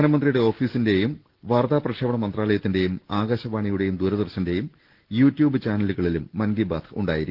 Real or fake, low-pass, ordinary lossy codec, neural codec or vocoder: real; 5.4 kHz; Opus, 16 kbps; none